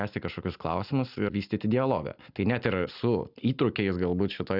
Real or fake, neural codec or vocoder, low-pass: real; none; 5.4 kHz